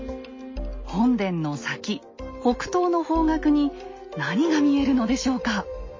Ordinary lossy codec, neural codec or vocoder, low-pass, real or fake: MP3, 32 kbps; none; 7.2 kHz; real